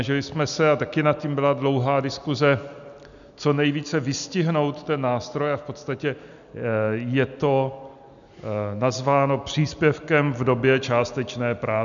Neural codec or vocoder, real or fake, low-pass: none; real; 7.2 kHz